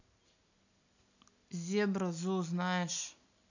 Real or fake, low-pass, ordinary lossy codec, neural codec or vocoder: real; 7.2 kHz; none; none